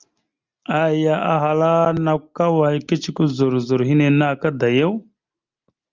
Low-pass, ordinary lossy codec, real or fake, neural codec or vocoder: 7.2 kHz; Opus, 32 kbps; real; none